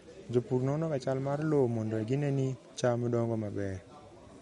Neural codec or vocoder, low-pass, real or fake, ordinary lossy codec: none; 19.8 kHz; real; MP3, 48 kbps